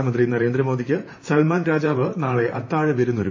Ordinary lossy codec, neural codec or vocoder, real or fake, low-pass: MP3, 32 kbps; vocoder, 44.1 kHz, 128 mel bands, Pupu-Vocoder; fake; 7.2 kHz